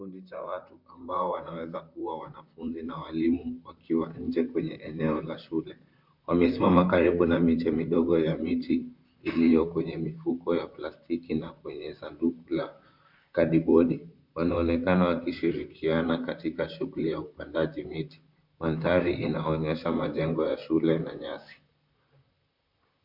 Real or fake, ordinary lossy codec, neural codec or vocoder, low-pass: fake; MP3, 48 kbps; vocoder, 44.1 kHz, 128 mel bands, Pupu-Vocoder; 5.4 kHz